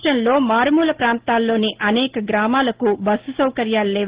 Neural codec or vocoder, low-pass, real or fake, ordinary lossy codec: none; 3.6 kHz; real; Opus, 16 kbps